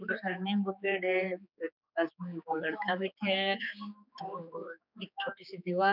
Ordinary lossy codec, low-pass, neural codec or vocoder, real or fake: none; 5.4 kHz; codec, 16 kHz, 4 kbps, X-Codec, HuBERT features, trained on general audio; fake